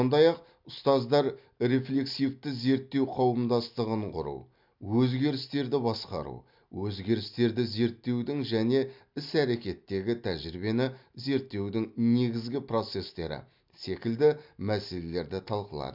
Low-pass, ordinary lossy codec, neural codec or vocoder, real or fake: 5.4 kHz; MP3, 48 kbps; none; real